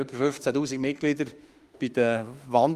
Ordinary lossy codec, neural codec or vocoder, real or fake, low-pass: Opus, 32 kbps; autoencoder, 48 kHz, 32 numbers a frame, DAC-VAE, trained on Japanese speech; fake; 14.4 kHz